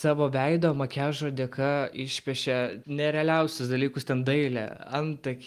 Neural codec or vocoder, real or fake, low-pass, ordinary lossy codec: none; real; 14.4 kHz; Opus, 32 kbps